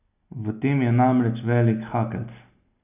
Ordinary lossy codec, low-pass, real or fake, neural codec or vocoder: none; 3.6 kHz; real; none